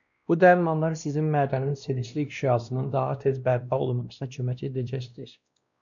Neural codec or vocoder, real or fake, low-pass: codec, 16 kHz, 1 kbps, X-Codec, WavLM features, trained on Multilingual LibriSpeech; fake; 7.2 kHz